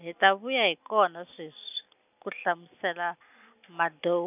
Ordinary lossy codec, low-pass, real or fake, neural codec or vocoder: none; 3.6 kHz; real; none